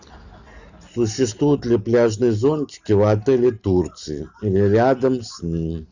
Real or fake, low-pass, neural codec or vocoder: real; 7.2 kHz; none